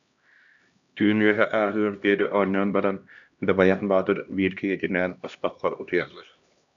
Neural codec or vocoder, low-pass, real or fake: codec, 16 kHz, 1 kbps, X-Codec, HuBERT features, trained on LibriSpeech; 7.2 kHz; fake